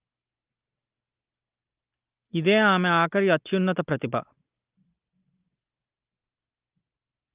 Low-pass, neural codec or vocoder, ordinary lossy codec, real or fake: 3.6 kHz; none; Opus, 32 kbps; real